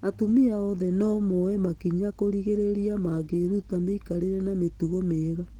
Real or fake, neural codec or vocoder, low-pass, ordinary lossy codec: real; none; 19.8 kHz; Opus, 16 kbps